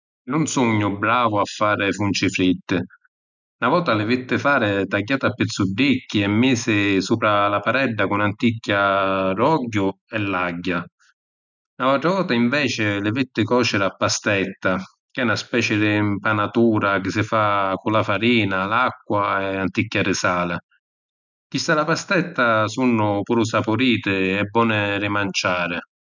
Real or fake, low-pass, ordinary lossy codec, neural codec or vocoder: real; 7.2 kHz; none; none